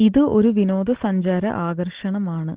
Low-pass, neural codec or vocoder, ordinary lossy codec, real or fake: 3.6 kHz; none; Opus, 16 kbps; real